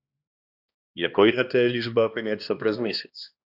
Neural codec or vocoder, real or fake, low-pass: codec, 16 kHz, 1 kbps, X-Codec, HuBERT features, trained on balanced general audio; fake; 5.4 kHz